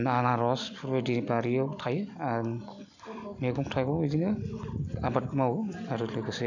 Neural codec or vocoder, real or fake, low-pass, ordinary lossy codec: none; real; 7.2 kHz; none